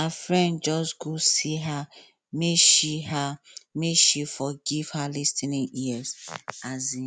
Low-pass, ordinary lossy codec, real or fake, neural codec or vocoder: none; none; real; none